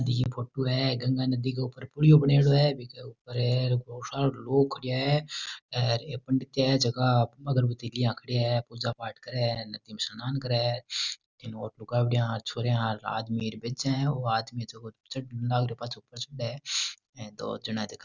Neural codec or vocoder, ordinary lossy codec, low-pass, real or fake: none; none; 7.2 kHz; real